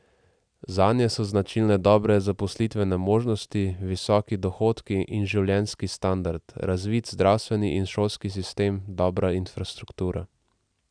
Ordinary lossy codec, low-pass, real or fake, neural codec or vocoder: none; 9.9 kHz; real; none